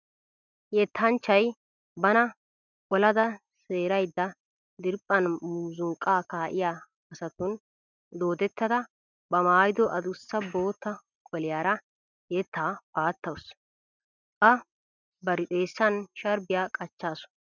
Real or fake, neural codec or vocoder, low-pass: real; none; 7.2 kHz